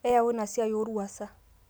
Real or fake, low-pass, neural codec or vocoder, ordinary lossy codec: real; none; none; none